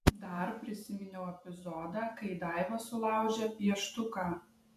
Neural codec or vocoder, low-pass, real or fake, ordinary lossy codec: vocoder, 48 kHz, 128 mel bands, Vocos; 14.4 kHz; fake; MP3, 96 kbps